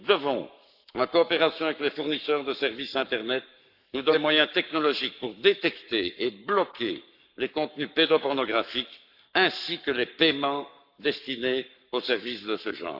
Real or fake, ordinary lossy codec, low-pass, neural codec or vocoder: fake; none; 5.4 kHz; codec, 44.1 kHz, 7.8 kbps, Pupu-Codec